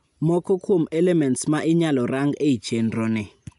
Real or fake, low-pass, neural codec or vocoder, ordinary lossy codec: real; 10.8 kHz; none; none